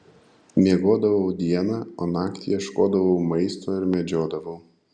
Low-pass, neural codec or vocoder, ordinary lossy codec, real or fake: 9.9 kHz; none; Opus, 64 kbps; real